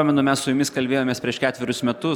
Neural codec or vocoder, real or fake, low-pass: none; real; 19.8 kHz